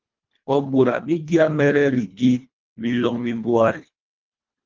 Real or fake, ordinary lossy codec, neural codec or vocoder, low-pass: fake; Opus, 32 kbps; codec, 24 kHz, 1.5 kbps, HILCodec; 7.2 kHz